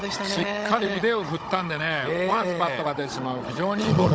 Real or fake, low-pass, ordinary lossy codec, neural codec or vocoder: fake; none; none; codec, 16 kHz, 16 kbps, FunCodec, trained on Chinese and English, 50 frames a second